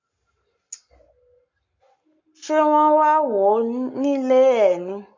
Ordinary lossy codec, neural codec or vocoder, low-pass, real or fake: MP3, 48 kbps; codec, 44.1 kHz, 7.8 kbps, Pupu-Codec; 7.2 kHz; fake